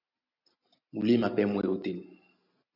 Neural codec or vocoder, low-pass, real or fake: none; 5.4 kHz; real